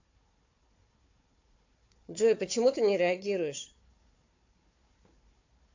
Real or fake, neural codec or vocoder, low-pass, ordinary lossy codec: fake; codec, 16 kHz, 4 kbps, FunCodec, trained on Chinese and English, 50 frames a second; 7.2 kHz; AAC, 48 kbps